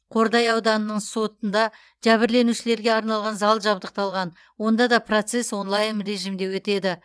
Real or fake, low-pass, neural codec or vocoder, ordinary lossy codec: fake; none; vocoder, 22.05 kHz, 80 mel bands, WaveNeXt; none